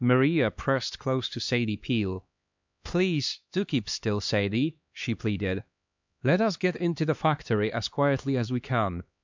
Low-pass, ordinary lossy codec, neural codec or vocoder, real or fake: 7.2 kHz; MP3, 64 kbps; codec, 16 kHz, 2 kbps, X-Codec, HuBERT features, trained on LibriSpeech; fake